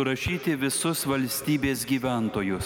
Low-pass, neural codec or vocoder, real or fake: 19.8 kHz; none; real